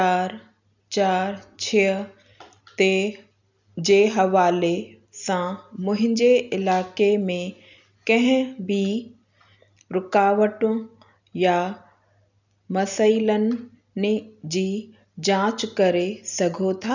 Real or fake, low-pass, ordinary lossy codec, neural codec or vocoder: real; 7.2 kHz; none; none